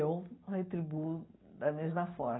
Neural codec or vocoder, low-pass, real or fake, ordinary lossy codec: none; 7.2 kHz; real; AAC, 16 kbps